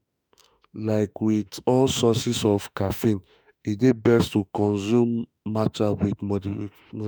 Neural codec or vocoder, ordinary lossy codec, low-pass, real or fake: autoencoder, 48 kHz, 32 numbers a frame, DAC-VAE, trained on Japanese speech; none; none; fake